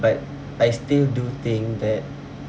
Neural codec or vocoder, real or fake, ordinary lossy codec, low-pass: none; real; none; none